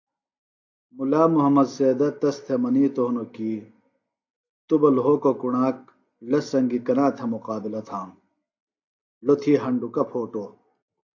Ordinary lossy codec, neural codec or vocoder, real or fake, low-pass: AAC, 48 kbps; none; real; 7.2 kHz